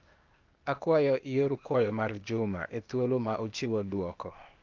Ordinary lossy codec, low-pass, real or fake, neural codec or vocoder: Opus, 24 kbps; 7.2 kHz; fake; codec, 16 kHz, 0.8 kbps, ZipCodec